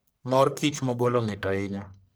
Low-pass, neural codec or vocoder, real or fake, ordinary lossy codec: none; codec, 44.1 kHz, 1.7 kbps, Pupu-Codec; fake; none